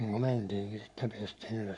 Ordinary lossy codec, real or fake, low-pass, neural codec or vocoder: none; fake; 10.8 kHz; codec, 44.1 kHz, 7.8 kbps, Pupu-Codec